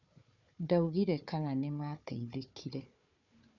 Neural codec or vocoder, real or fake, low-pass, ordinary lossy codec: codec, 16 kHz, 4 kbps, FunCodec, trained on Chinese and English, 50 frames a second; fake; 7.2 kHz; none